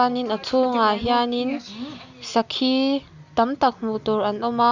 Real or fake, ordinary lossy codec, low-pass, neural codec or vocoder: real; Opus, 64 kbps; 7.2 kHz; none